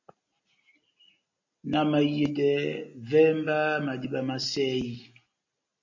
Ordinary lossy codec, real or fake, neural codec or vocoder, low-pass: MP3, 32 kbps; real; none; 7.2 kHz